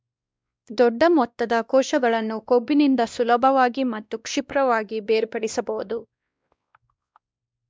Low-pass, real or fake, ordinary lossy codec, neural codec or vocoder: none; fake; none; codec, 16 kHz, 1 kbps, X-Codec, WavLM features, trained on Multilingual LibriSpeech